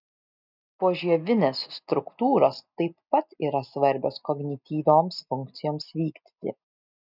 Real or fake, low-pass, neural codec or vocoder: real; 5.4 kHz; none